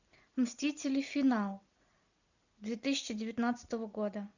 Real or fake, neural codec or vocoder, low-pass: real; none; 7.2 kHz